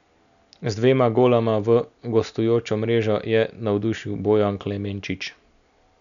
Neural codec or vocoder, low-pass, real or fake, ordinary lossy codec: none; 7.2 kHz; real; none